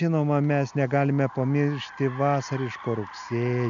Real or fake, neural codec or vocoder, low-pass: real; none; 7.2 kHz